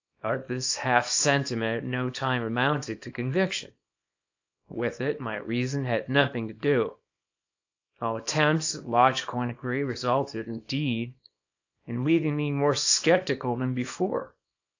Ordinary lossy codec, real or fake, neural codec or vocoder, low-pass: AAC, 48 kbps; fake; codec, 24 kHz, 0.9 kbps, WavTokenizer, small release; 7.2 kHz